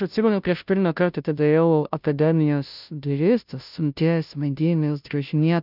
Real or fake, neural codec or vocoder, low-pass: fake; codec, 16 kHz, 0.5 kbps, FunCodec, trained on Chinese and English, 25 frames a second; 5.4 kHz